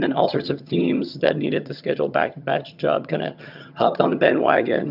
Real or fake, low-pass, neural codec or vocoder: fake; 5.4 kHz; vocoder, 22.05 kHz, 80 mel bands, HiFi-GAN